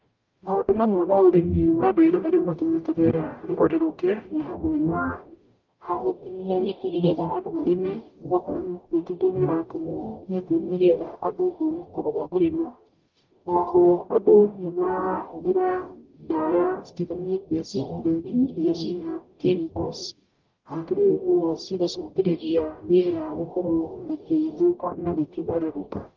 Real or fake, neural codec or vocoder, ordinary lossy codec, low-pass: fake; codec, 44.1 kHz, 0.9 kbps, DAC; Opus, 24 kbps; 7.2 kHz